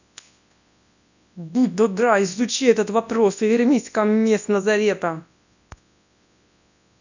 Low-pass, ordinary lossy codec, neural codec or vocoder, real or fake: 7.2 kHz; none; codec, 24 kHz, 0.9 kbps, WavTokenizer, large speech release; fake